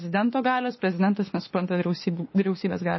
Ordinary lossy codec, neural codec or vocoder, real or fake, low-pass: MP3, 24 kbps; autoencoder, 48 kHz, 32 numbers a frame, DAC-VAE, trained on Japanese speech; fake; 7.2 kHz